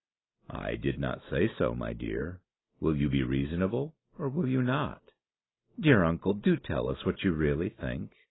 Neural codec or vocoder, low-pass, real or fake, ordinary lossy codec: none; 7.2 kHz; real; AAC, 16 kbps